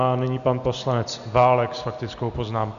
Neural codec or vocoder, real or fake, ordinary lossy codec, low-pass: none; real; MP3, 96 kbps; 7.2 kHz